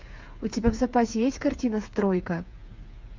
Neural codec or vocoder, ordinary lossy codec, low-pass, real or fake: codec, 16 kHz, 8 kbps, FreqCodec, smaller model; MP3, 64 kbps; 7.2 kHz; fake